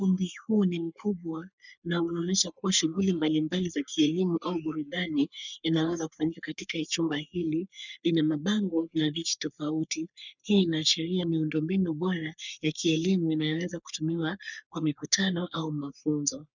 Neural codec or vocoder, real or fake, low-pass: codec, 44.1 kHz, 3.4 kbps, Pupu-Codec; fake; 7.2 kHz